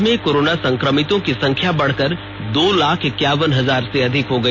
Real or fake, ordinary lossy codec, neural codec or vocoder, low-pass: real; none; none; none